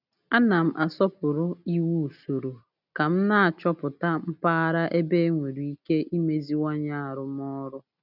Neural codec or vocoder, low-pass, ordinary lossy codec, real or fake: none; 5.4 kHz; none; real